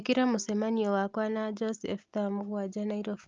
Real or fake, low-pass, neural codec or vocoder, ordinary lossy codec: real; 7.2 kHz; none; Opus, 24 kbps